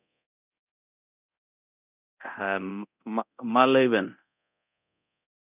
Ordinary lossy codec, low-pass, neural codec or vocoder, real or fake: none; 3.6 kHz; codec, 24 kHz, 0.9 kbps, DualCodec; fake